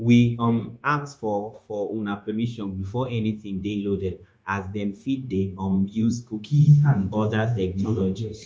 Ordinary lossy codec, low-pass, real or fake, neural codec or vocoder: none; none; fake; codec, 16 kHz, 0.9 kbps, LongCat-Audio-Codec